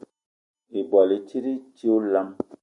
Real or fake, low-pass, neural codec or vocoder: real; 10.8 kHz; none